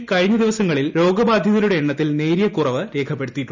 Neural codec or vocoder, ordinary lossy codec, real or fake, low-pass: none; none; real; 7.2 kHz